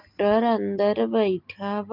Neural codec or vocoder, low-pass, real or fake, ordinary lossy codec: none; 5.4 kHz; real; Opus, 32 kbps